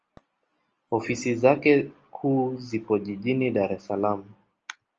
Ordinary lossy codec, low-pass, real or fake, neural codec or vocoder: Opus, 24 kbps; 7.2 kHz; real; none